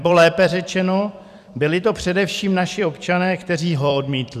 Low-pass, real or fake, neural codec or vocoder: 14.4 kHz; fake; vocoder, 44.1 kHz, 128 mel bands every 256 samples, BigVGAN v2